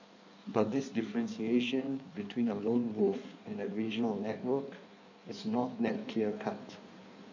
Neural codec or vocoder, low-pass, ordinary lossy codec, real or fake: codec, 16 kHz in and 24 kHz out, 1.1 kbps, FireRedTTS-2 codec; 7.2 kHz; none; fake